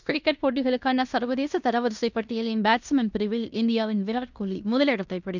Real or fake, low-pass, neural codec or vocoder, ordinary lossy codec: fake; 7.2 kHz; codec, 16 kHz in and 24 kHz out, 0.9 kbps, LongCat-Audio-Codec, fine tuned four codebook decoder; none